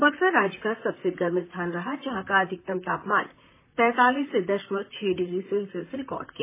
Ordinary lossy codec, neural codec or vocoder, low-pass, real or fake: MP3, 16 kbps; vocoder, 44.1 kHz, 128 mel bands, Pupu-Vocoder; 3.6 kHz; fake